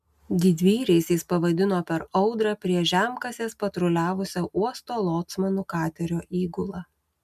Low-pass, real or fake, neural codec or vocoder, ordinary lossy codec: 14.4 kHz; real; none; MP3, 96 kbps